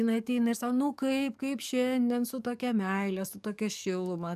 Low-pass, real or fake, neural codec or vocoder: 14.4 kHz; fake; codec, 44.1 kHz, 7.8 kbps, DAC